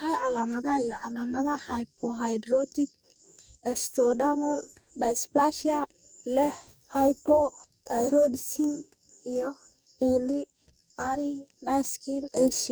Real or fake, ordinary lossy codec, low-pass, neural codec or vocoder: fake; none; none; codec, 44.1 kHz, 2.6 kbps, DAC